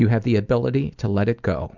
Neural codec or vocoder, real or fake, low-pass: none; real; 7.2 kHz